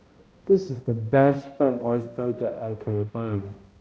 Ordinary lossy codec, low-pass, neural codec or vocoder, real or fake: none; none; codec, 16 kHz, 0.5 kbps, X-Codec, HuBERT features, trained on balanced general audio; fake